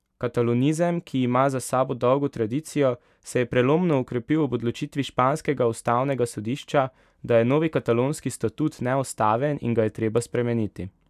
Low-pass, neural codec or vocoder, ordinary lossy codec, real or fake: 14.4 kHz; none; none; real